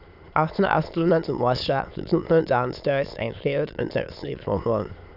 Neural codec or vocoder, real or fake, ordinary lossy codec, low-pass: autoencoder, 22.05 kHz, a latent of 192 numbers a frame, VITS, trained on many speakers; fake; none; 5.4 kHz